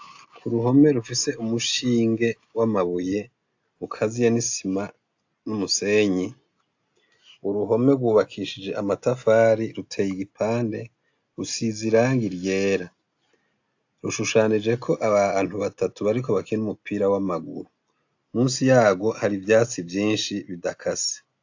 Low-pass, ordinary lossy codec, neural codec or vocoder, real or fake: 7.2 kHz; AAC, 48 kbps; none; real